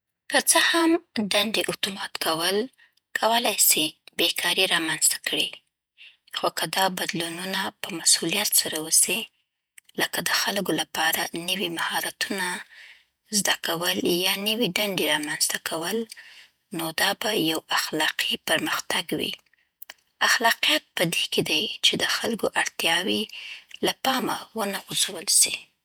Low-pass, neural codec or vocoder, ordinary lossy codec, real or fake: none; vocoder, 48 kHz, 128 mel bands, Vocos; none; fake